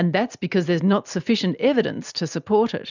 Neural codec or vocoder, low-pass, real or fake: none; 7.2 kHz; real